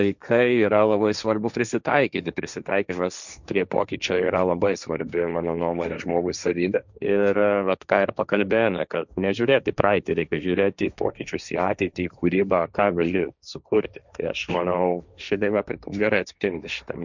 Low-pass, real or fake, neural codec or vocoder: 7.2 kHz; fake; codec, 16 kHz, 1.1 kbps, Voila-Tokenizer